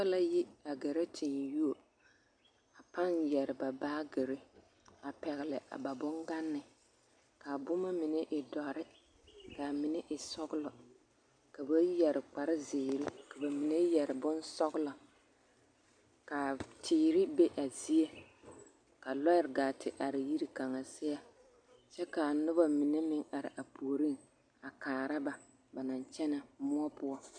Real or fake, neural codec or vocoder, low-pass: real; none; 9.9 kHz